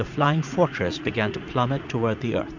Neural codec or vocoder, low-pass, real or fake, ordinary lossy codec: vocoder, 44.1 kHz, 80 mel bands, Vocos; 7.2 kHz; fake; MP3, 64 kbps